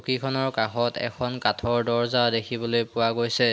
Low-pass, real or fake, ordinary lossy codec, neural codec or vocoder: none; real; none; none